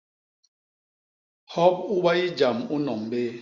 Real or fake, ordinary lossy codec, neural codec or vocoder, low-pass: real; Opus, 64 kbps; none; 7.2 kHz